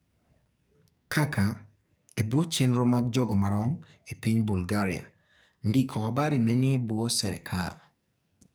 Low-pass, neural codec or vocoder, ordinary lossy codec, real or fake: none; codec, 44.1 kHz, 2.6 kbps, SNAC; none; fake